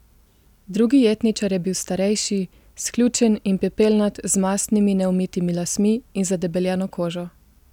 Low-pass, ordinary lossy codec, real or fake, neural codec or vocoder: 19.8 kHz; none; real; none